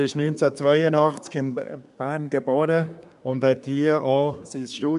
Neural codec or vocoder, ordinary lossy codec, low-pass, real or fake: codec, 24 kHz, 1 kbps, SNAC; none; 10.8 kHz; fake